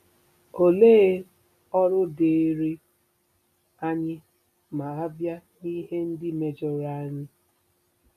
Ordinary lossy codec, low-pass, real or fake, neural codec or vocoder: none; 14.4 kHz; real; none